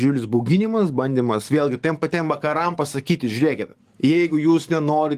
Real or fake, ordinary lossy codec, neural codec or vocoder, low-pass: fake; Opus, 32 kbps; codec, 44.1 kHz, 7.8 kbps, DAC; 14.4 kHz